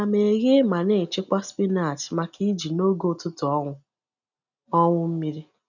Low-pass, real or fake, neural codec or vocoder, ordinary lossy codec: 7.2 kHz; real; none; none